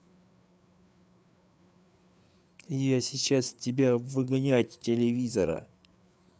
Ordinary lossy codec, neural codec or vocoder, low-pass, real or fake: none; codec, 16 kHz, 4 kbps, FreqCodec, larger model; none; fake